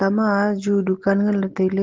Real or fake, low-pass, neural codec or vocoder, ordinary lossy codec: real; 7.2 kHz; none; Opus, 16 kbps